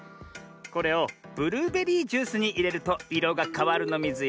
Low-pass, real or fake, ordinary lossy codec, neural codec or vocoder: none; real; none; none